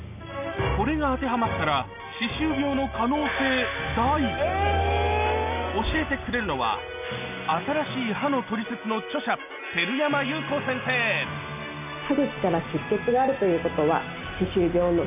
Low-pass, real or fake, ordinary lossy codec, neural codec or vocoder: 3.6 kHz; real; none; none